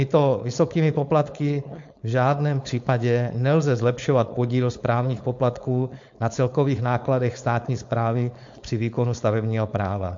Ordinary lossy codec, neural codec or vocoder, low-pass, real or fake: MP3, 48 kbps; codec, 16 kHz, 4.8 kbps, FACodec; 7.2 kHz; fake